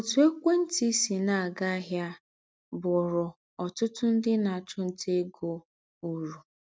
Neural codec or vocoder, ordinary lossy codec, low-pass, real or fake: none; none; none; real